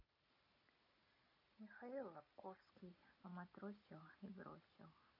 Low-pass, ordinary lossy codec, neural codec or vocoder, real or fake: 5.4 kHz; none; none; real